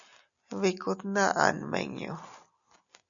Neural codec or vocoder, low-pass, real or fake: none; 7.2 kHz; real